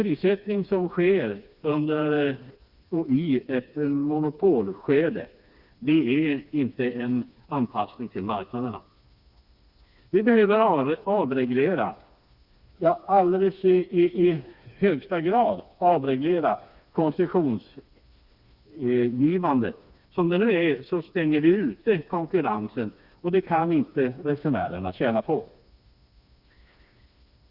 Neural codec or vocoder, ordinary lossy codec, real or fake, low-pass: codec, 16 kHz, 2 kbps, FreqCodec, smaller model; Opus, 64 kbps; fake; 5.4 kHz